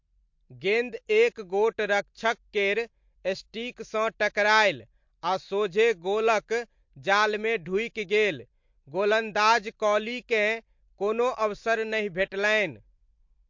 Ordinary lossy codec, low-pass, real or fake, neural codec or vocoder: MP3, 48 kbps; 7.2 kHz; real; none